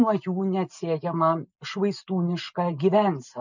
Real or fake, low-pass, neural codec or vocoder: real; 7.2 kHz; none